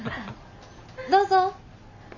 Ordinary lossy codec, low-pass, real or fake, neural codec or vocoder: none; 7.2 kHz; real; none